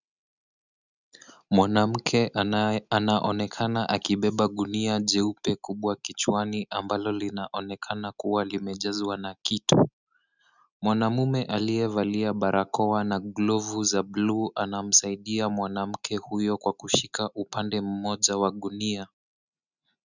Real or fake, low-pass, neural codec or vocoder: real; 7.2 kHz; none